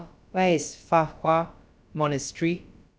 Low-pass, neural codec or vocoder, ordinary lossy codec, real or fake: none; codec, 16 kHz, about 1 kbps, DyCAST, with the encoder's durations; none; fake